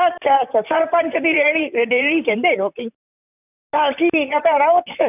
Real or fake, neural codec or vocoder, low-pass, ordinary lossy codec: fake; vocoder, 44.1 kHz, 128 mel bands, Pupu-Vocoder; 3.6 kHz; none